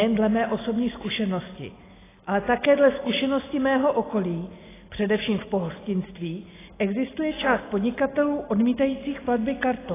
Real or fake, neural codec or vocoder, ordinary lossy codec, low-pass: real; none; AAC, 16 kbps; 3.6 kHz